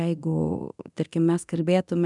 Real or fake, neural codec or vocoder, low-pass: fake; codec, 24 kHz, 0.9 kbps, DualCodec; 10.8 kHz